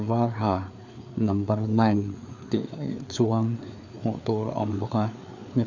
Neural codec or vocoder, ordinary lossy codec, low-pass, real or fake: codec, 16 kHz, 4 kbps, FreqCodec, larger model; none; 7.2 kHz; fake